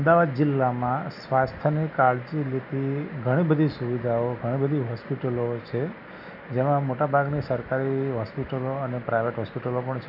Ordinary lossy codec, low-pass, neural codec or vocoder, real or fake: none; 5.4 kHz; none; real